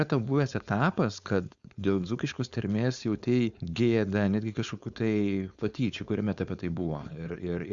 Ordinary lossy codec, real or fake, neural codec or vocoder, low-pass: Opus, 64 kbps; fake; codec, 16 kHz, 4.8 kbps, FACodec; 7.2 kHz